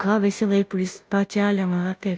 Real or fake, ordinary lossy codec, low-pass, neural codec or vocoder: fake; none; none; codec, 16 kHz, 0.5 kbps, FunCodec, trained on Chinese and English, 25 frames a second